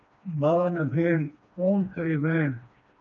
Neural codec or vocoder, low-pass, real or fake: codec, 16 kHz, 2 kbps, FreqCodec, smaller model; 7.2 kHz; fake